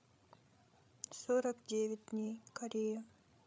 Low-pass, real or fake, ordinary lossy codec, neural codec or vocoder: none; fake; none; codec, 16 kHz, 16 kbps, FreqCodec, larger model